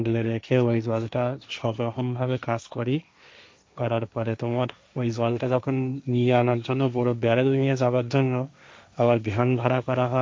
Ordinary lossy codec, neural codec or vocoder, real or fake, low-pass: none; codec, 16 kHz, 1.1 kbps, Voila-Tokenizer; fake; none